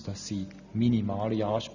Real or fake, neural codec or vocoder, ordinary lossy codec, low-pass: real; none; none; 7.2 kHz